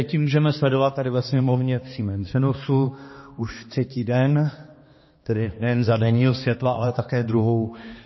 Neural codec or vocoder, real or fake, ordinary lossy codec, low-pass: codec, 16 kHz, 2 kbps, X-Codec, HuBERT features, trained on balanced general audio; fake; MP3, 24 kbps; 7.2 kHz